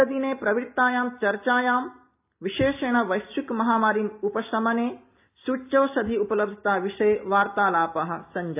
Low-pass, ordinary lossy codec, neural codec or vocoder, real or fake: 3.6 kHz; AAC, 32 kbps; none; real